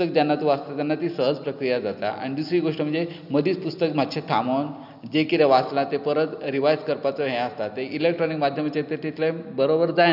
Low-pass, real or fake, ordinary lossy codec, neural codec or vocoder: 5.4 kHz; real; none; none